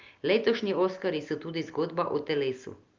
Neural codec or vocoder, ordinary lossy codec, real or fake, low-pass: none; Opus, 24 kbps; real; 7.2 kHz